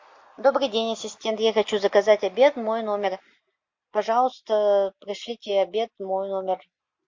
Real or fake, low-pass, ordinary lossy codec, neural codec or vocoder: real; 7.2 kHz; MP3, 48 kbps; none